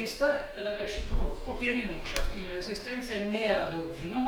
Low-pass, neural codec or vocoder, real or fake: 19.8 kHz; codec, 44.1 kHz, 2.6 kbps, DAC; fake